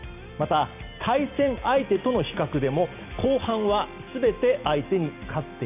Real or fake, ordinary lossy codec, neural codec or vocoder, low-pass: real; none; none; 3.6 kHz